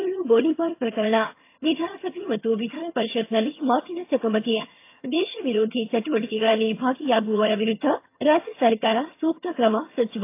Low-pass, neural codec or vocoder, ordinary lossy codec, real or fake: 3.6 kHz; vocoder, 22.05 kHz, 80 mel bands, HiFi-GAN; AAC, 24 kbps; fake